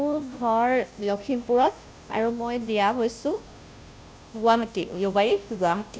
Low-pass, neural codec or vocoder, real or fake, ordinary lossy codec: none; codec, 16 kHz, 0.5 kbps, FunCodec, trained on Chinese and English, 25 frames a second; fake; none